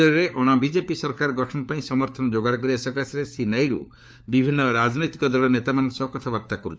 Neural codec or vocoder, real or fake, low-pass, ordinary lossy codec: codec, 16 kHz, 4 kbps, FunCodec, trained on LibriTTS, 50 frames a second; fake; none; none